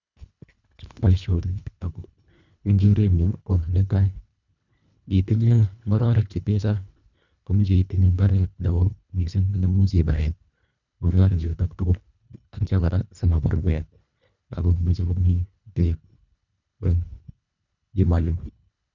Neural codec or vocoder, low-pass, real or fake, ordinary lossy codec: codec, 24 kHz, 1.5 kbps, HILCodec; 7.2 kHz; fake; none